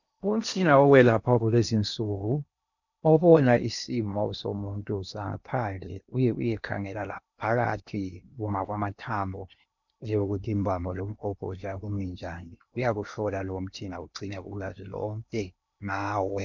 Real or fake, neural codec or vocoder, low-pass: fake; codec, 16 kHz in and 24 kHz out, 0.8 kbps, FocalCodec, streaming, 65536 codes; 7.2 kHz